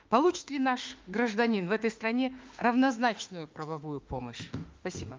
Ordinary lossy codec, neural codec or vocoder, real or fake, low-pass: Opus, 32 kbps; autoencoder, 48 kHz, 32 numbers a frame, DAC-VAE, trained on Japanese speech; fake; 7.2 kHz